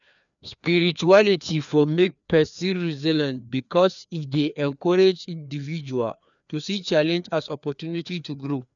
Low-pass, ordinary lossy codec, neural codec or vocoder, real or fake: 7.2 kHz; none; codec, 16 kHz, 2 kbps, FreqCodec, larger model; fake